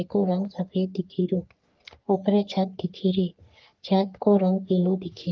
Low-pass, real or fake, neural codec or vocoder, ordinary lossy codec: 7.2 kHz; fake; codec, 44.1 kHz, 3.4 kbps, Pupu-Codec; Opus, 24 kbps